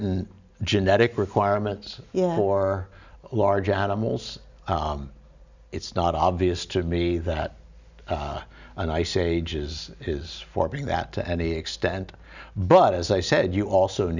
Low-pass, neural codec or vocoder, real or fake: 7.2 kHz; none; real